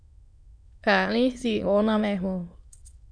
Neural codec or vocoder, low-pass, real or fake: autoencoder, 22.05 kHz, a latent of 192 numbers a frame, VITS, trained on many speakers; 9.9 kHz; fake